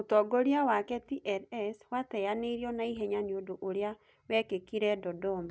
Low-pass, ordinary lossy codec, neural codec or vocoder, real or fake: none; none; none; real